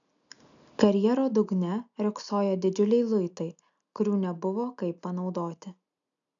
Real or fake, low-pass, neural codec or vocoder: real; 7.2 kHz; none